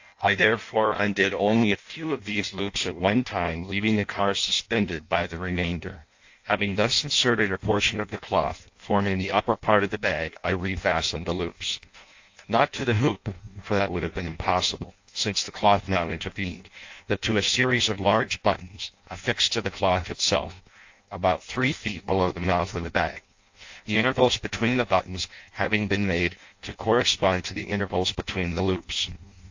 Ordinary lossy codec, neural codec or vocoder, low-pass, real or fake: AAC, 48 kbps; codec, 16 kHz in and 24 kHz out, 0.6 kbps, FireRedTTS-2 codec; 7.2 kHz; fake